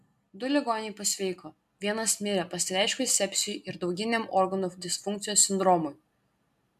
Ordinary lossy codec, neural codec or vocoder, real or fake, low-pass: MP3, 96 kbps; none; real; 14.4 kHz